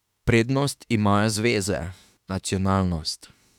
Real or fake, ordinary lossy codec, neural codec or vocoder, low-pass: fake; none; autoencoder, 48 kHz, 32 numbers a frame, DAC-VAE, trained on Japanese speech; 19.8 kHz